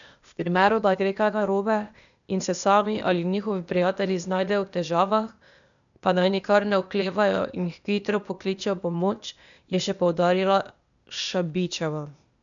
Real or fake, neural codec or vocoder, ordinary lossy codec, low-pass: fake; codec, 16 kHz, 0.8 kbps, ZipCodec; none; 7.2 kHz